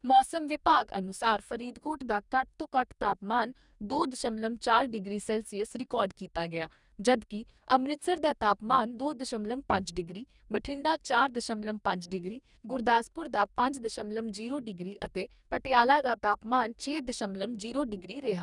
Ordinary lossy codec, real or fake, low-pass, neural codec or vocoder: none; fake; 10.8 kHz; codec, 44.1 kHz, 2.6 kbps, DAC